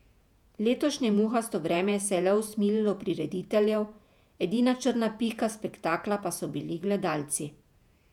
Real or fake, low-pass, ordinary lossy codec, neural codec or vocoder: fake; 19.8 kHz; none; vocoder, 48 kHz, 128 mel bands, Vocos